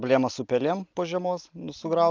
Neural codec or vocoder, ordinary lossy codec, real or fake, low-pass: none; Opus, 24 kbps; real; 7.2 kHz